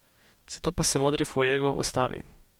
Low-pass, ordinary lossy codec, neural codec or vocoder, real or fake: 19.8 kHz; MP3, 96 kbps; codec, 44.1 kHz, 2.6 kbps, DAC; fake